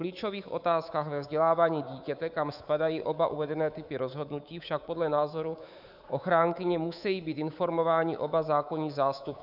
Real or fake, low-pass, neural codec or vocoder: fake; 5.4 kHz; codec, 24 kHz, 3.1 kbps, DualCodec